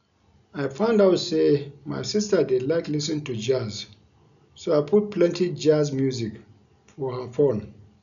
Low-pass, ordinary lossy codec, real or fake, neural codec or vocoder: 7.2 kHz; none; real; none